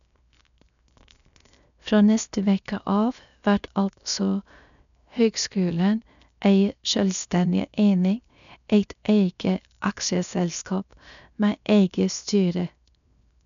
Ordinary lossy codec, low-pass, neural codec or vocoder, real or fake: none; 7.2 kHz; codec, 16 kHz, 0.7 kbps, FocalCodec; fake